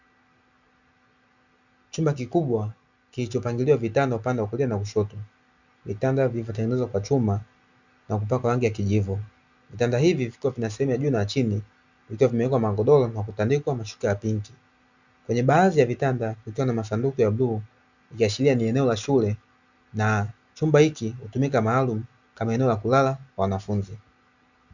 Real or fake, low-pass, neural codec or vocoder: real; 7.2 kHz; none